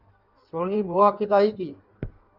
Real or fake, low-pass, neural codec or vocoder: fake; 5.4 kHz; codec, 16 kHz in and 24 kHz out, 1.1 kbps, FireRedTTS-2 codec